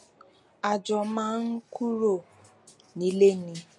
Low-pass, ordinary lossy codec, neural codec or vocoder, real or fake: 10.8 kHz; MP3, 48 kbps; none; real